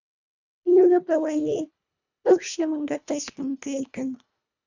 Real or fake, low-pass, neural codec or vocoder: fake; 7.2 kHz; codec, 24 kHz, 1.5 kbps, HILCodec